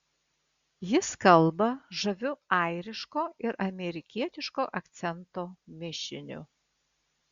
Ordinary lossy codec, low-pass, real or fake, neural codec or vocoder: Opus, 64 kbps; 7.2 kHz; real; none